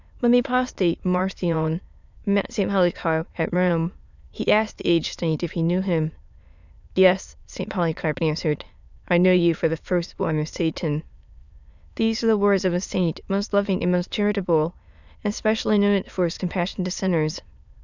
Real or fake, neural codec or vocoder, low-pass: fake; autoencoder, 22.05 kHz, a latent of 192 numbers a frame, VITS, trained on many speakers; 7.2 kHz